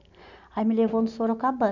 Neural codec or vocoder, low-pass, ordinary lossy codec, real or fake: none; 7.2 kHz; none; real